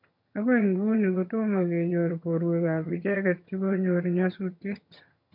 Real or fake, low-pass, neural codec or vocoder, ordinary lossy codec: fake; 5.4 kHz; vocoder, 22.05 kHz, 80 mel bands, HiFi-GAN; none